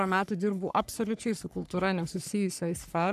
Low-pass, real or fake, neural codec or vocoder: 14.4 kHz; fake; codec, 44.1 kHz, 3.4 kbps, Pupu-Codec